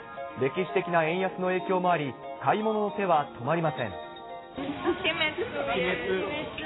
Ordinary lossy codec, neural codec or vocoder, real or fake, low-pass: AAC, 16 kbps; none; real; 7.2 kHz